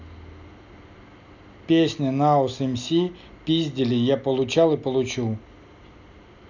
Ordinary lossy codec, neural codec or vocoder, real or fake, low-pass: none; none; real; 7.2 kHz